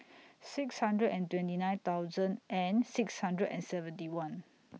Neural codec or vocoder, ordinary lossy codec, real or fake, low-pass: none; none; real; none